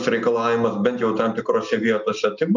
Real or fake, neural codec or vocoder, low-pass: real; none; 7.2 kHz